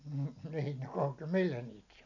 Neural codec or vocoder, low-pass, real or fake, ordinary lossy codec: none; 7.2 kHz; real; none